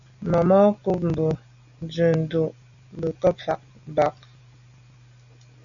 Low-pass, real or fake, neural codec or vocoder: 7.2 kHz; real; none